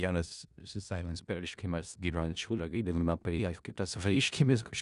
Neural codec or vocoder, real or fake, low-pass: codec, 16 kHz in and 24 kHz out, 0.4 kbps, LongCat-Audio-Codec, four codebook decoder; fake; 10.8 kHz